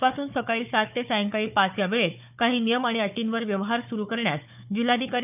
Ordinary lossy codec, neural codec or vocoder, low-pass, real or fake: none; codec, 16 kHz, 4 kbps, FunCodec, trained on Chinese and English, 50 frames a second; 3.6 kHz; fake